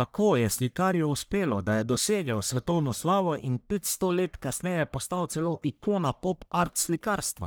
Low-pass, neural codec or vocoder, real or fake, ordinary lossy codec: none; codec, 44.1 kHz, 1.7 kbps, Pupu-Codec; fake; none